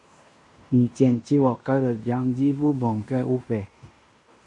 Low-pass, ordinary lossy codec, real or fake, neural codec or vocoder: 10.8 kHz; MP3, 48 kbps; fake; codec, 16 kHz in and 24 kHz out, 0.9 kbps, LongCat-Audio-Codec, fine tuned four codebook decoder